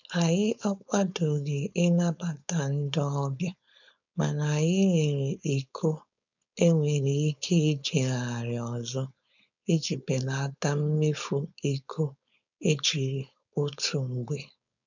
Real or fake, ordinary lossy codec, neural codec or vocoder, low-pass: fake; none; codec, 16 kHz, 4.8 kbps, FACodec; 7.2 kHz